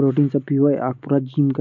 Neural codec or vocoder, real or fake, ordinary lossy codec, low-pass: none; real; none; 7.2 kHz